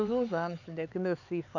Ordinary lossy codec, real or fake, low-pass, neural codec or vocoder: none; fake; 7.2 kHz; codec, 16 kHz, 4 kbps, X-Codec, HuBERT features, trained on LibriSpeech